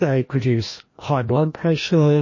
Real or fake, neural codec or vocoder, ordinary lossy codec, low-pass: fake; codec, 16 kHz, 1 kbps, FreqCodec, larger model; MP3, 32 kbps; 7.2 kHz